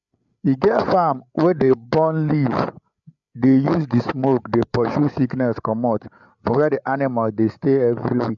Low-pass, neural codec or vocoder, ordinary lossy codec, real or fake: 7.2 kHz; codec, 16 kHz, 8 kbps, FreqCodec, larger model; MP3, 96 kbps; fake